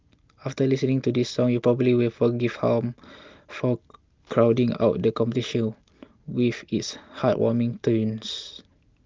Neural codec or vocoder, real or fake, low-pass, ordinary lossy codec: none; real; 7.2 kHz; Opus, 32 kbps